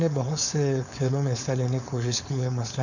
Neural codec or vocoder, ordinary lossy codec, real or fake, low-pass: codec, 16 kHz, 4.8 kbps, FACodec; none; fake; 7.2 kHz